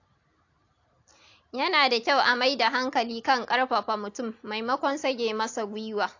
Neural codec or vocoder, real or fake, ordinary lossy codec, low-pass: none; real; AAC, 48 kbps; 7.2 kHz